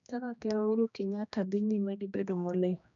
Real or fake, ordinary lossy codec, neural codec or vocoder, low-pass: fake; none; codec, 16 kHz, 1 kbps, X-Codec, HuBERT features, trained on general audio; 7.2 kHz